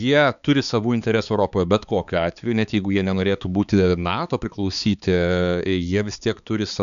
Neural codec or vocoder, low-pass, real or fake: codec, 16 kHz, 4 kbps, X-Codec, HuBERT features, trained on balanced general audio; 7.2 kHz; fake